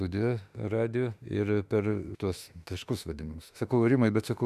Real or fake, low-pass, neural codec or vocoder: fake; 14.4 kHz; autoencoder, 48 kHz, 32 numbers a frame, DAC-VAE, trained on Japanese speech